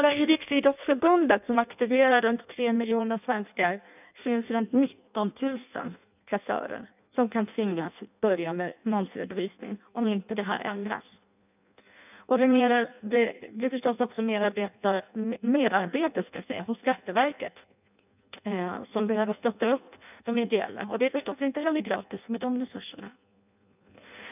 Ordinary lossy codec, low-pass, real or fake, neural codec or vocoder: none; 3.6 kHz; fake; codec, 16 kHz in and 24 kHz out, 0.6 kbps, FireRedTTS-2 codec